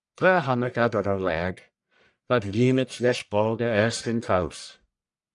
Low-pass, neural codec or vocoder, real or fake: 10.8 kHz; codec, 44.1 kHz, 1.7 kbps, Pupu-Codec; fake